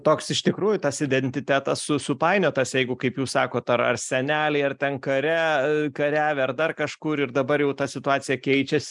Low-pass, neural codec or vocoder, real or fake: 10.8 kHz; none; real